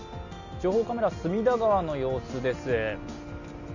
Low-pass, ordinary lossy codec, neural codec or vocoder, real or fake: 7.2 kHz; none; none; real